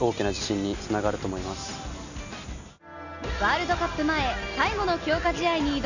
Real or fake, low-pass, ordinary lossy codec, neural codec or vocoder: real; 7.2 kHz; none; none